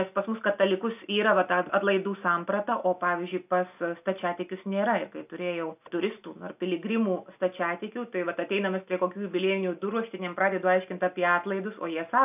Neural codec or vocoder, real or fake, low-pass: none; real; 3.6 kHz